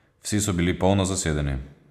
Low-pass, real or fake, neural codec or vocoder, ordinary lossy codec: 14.4 kHz; real; none; none